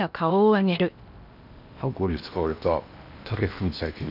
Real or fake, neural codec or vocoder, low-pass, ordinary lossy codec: fake; codec, 16 kHz in and 24 kHz out, 0.8 kbps, FocalCodec, streaming, 65536 codes; 5.4 kHz; none